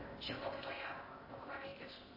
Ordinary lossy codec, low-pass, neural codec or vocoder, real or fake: MP3, 24 kbps; 5.4 kHz; codec, 16 kHz in and 24 kHz out, 0.6 kbps, FocalCodec, streaming, 4096 codes; fake